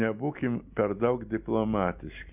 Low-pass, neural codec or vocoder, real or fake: 3.6 kHz; none; real